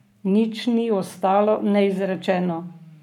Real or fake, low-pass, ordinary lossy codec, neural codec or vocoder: fake; 19.8 kHz; none; codec, 44.1 kHz, 7.8 kbps, Pupu-Codec